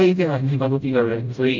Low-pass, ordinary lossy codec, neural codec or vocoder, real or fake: 7.2 kHz; MP3, 64 kbps; codec, 16 kHz, 0.5 kbps, FreqCodec, smaller model; fake